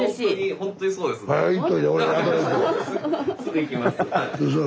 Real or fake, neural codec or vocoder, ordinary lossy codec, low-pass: real; none; none; none